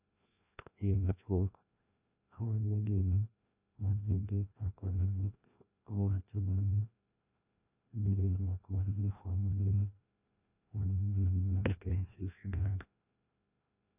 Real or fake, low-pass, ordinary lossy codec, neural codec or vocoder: fake; 3.6 kHz; none; codec, 16 kHz, 1 kbps, FreqCodec, larger model